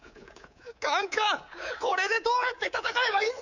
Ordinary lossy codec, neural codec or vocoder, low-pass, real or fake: none; codec, 24 kHz, 3.1 kbps, DualCodec; 7.2 kHz; fake